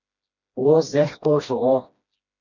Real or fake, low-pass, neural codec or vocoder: fake; 7.2 kHz; codec, 16 kHz, 1 kbps, FreqCodec, smaller model